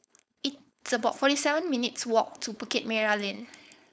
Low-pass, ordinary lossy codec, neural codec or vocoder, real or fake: none; none; codec, 16 kHz, 4.8 kbps, FACodec; fake